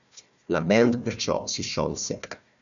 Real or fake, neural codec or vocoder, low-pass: fake; codec, 16 kHz, 1 kbps, FunCodec, trained on Chinese and English, 50 frames a second; 7.2 kHz